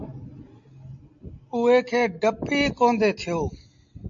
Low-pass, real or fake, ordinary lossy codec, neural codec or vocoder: 7.2 kHz; real; MP3, 48 kbps; none